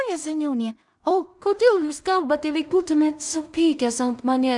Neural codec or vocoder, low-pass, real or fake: codec, 16 kHz in and 24 kHz out, 0.4 kbps, LongCat-Audio-Codec, two codebook decoder; 10.8 kHz; fake